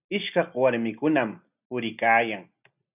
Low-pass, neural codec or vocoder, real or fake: 3.6 kHz; none; real